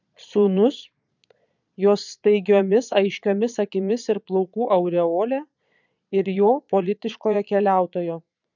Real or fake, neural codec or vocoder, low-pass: fake; vocoder, 22.05 kHz, 80 mel bands, Vocos; 7.2 kHz